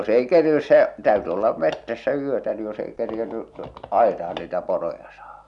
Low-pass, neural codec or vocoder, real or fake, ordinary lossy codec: 10.8 kHz; none; real; none